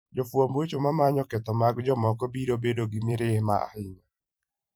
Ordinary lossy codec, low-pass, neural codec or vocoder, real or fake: none; none; vocoder, 44.1 kHz, 128 mel bands every 512 samples, BigVGAN v2; fake